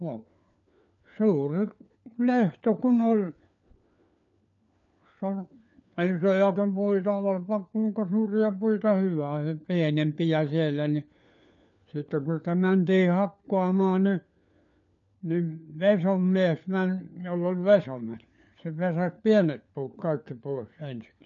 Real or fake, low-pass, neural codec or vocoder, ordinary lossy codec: fake; 7.2 kHz; codec, 16 kHz, 4 kbps, FunCodec, trained on LibriTTS, 50 frames a second; none